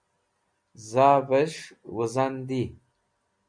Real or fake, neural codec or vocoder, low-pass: real; none; 9.9 kHz